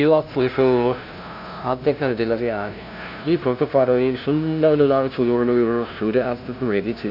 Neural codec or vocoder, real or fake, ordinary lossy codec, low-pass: codec, 16 kHz, 0.5 kbps, FunCodec, trained on LibriTTS, 25 frames a second; fake; none; 5.4 kHz